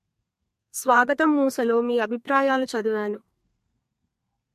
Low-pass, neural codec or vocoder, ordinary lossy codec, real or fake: 14.4 kHz; codec, 32 kHz, 1.9 kbps, SNAC; MP3, 64 kbps; fake